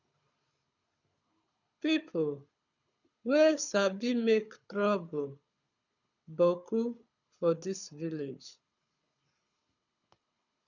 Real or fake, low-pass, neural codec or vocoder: fake; 7.2 kHz; codec, 24 kHz, 6 kbps, HILCodec